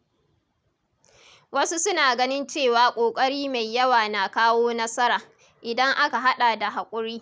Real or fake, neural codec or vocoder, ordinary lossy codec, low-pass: real; none; none; none